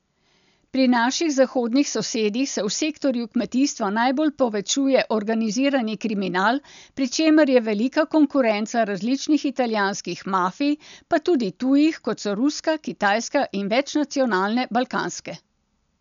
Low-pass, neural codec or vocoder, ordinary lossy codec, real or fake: 7.2 kHz; none; none; real